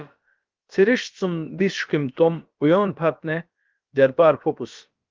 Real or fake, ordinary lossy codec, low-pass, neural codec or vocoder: fake; Opus, 32 kbps; 7.2 kHz; codec, 16 kHz, about 1 kbps, DyCAST, with the encoder's durations